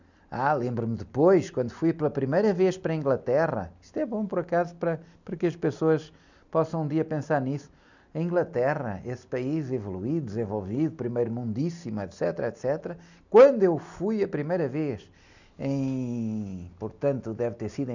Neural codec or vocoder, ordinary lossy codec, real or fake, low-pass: none; none; real; 7.2 kHz